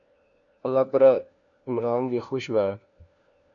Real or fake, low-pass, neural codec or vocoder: fake; 7.2 kHz; codec, 16 kHz, 1 kbps, FunCodec, trained on LibriTTS, 50 frames a second